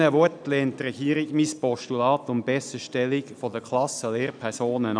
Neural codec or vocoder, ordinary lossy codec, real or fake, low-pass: none; none; real; 9.9 kHz